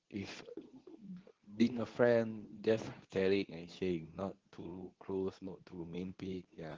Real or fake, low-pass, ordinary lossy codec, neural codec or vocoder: fake; 7.2 kHz; Opus, 16 kbps; codec, 24 kHz, 0.9 kbps, WavTokenizer, medium speech release version 2